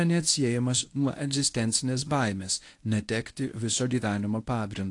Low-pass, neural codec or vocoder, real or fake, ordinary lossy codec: 10.8 kHz; codec, 24 kHz, 0.9 kbps, WavTokenizer, medium speech release version 1; fake; AAC, 48 kbps